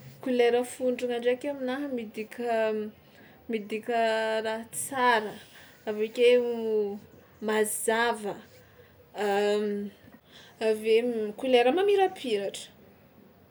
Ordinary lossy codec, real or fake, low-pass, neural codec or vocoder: none; real; none; none